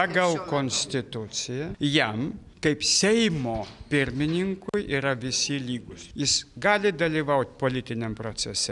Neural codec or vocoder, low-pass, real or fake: none; 10.8 kHz; real